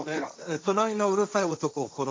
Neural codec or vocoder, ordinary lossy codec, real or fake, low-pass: codec, 16 kHz, 1.1 kbps, Voila-Tokenizer; none; fake; none